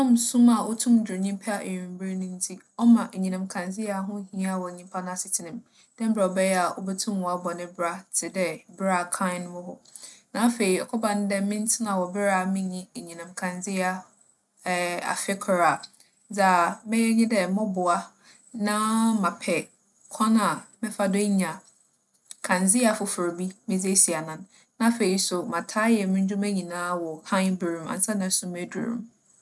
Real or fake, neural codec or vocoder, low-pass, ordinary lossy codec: real; none; none; none